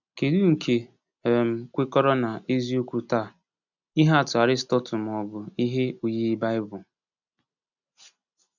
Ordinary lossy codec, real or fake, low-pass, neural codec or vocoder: none; real; 7.2 kHz; none